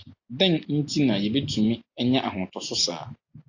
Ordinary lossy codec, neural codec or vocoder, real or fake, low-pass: AAC, 48 kbps; none; real; 7.2 kHz